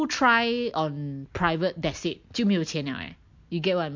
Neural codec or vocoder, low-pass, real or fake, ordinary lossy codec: none; 7.2 kHz; real; MP3, 48 kbps